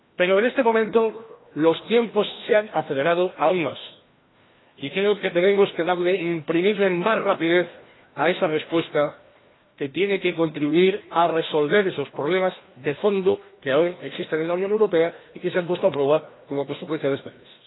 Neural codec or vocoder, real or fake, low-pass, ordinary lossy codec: codec, 16 kHz, 1 kbps, FreqCodec, larger model; fake; 7.2 kHz; AAC, 16 kbps